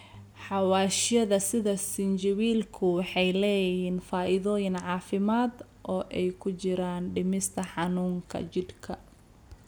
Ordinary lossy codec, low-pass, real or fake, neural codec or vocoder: none; none; real; none